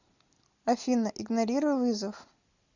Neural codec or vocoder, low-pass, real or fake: none; 7.2 kHz; real